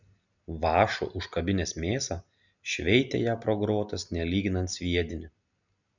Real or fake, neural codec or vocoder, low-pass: real; none; 7.2 kHz